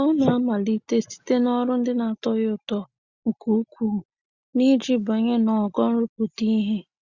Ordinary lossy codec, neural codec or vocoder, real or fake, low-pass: Opus, 64 kbps; none; real; 7.2 kHz